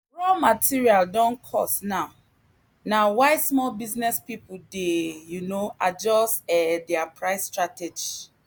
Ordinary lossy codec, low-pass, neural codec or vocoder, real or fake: none; none; none; real